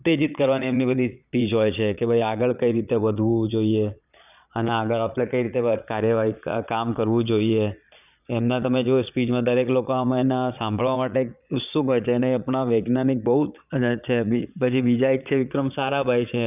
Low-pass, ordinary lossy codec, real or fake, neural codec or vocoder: 3.6 kHz; none; fake; vocoder, 22.05 kHz, 80 mel bands, Vocos